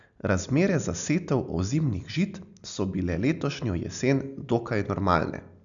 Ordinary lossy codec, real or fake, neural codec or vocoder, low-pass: none; real; none; 7.2 kHz